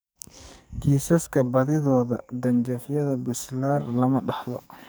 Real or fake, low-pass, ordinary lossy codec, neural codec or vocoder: fake; none; none; codec, 44.1 kHz, 2.6 kbps, SNAC